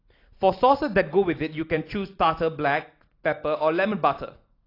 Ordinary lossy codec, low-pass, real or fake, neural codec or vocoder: AAC, 32 kbps; 5.4 kHz; real; none